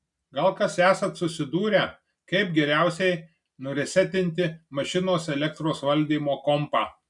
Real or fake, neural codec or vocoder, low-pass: real; none; 10.8 kHz